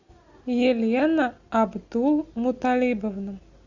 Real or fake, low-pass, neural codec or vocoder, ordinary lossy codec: real; 7.2 kHz; none; Opus, 64 kbps